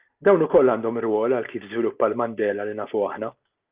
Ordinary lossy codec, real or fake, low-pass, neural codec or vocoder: Opus, 24 kbps; real; 3.6 kHz; none